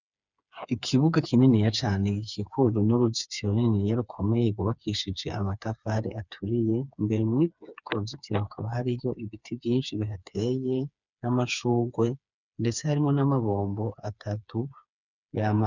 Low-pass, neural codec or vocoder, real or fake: 7.2 kHz; codec, 16 kHz, 4 kbps, FreqCodec, smaller model; fake